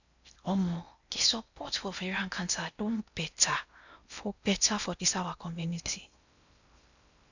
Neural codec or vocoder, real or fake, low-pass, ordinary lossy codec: codec, 16 kHz in and 24 kHz out, 0.6 kbps, FocalCodec, streaming, 4096 codes; fake; 7.2 kHz; none